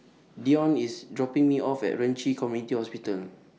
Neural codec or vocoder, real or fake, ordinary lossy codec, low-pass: none; real; none; none